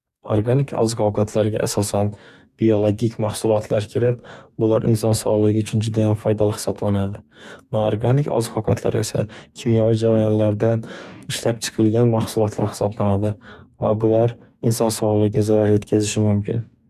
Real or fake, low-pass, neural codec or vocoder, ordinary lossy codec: fake; 14.4 kHz; codec, 44.1 kHz, 2.6 kbps, DAC; none